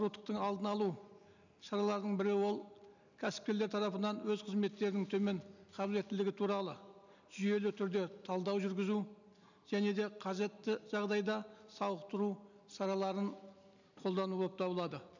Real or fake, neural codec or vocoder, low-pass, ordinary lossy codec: real; none; 7.2 kHz; none